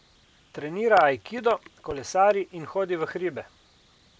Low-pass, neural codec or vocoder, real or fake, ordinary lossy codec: none; none; real; none